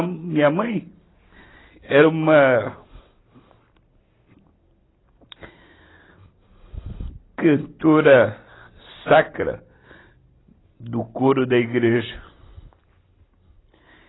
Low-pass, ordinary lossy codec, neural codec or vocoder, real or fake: 7.2 kHz; AAC, 16 kbps; none; real